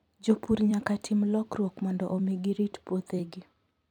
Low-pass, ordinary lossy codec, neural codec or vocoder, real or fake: 19.8 kHz; none; vocoder, 44.1 kHz, 128 mel bands every 256 samples, BigVGAN v2; fake